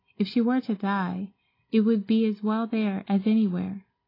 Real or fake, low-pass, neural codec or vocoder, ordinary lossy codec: real; 5.4 kHz; none; AAC, 24 kbps